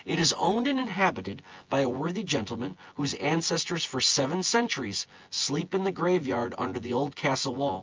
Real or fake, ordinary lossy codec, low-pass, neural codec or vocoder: fake; Opus, 24 kbps; 7.2 kHz; vocoder, 24 kHz, 100 mel bands, Vocos